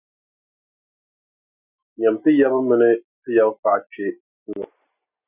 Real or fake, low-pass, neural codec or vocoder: real; 3.6 kHz; none